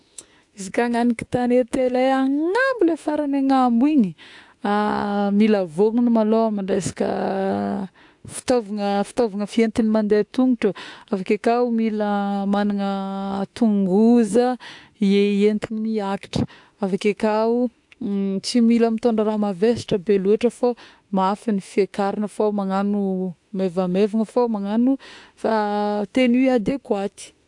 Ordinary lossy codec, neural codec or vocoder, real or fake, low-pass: AAC, 64 kbps; autoencoder, 48 kHz, 32 numbers a frame, DAC-VAE, trained on Japanese speech; fake; 10.8 kHz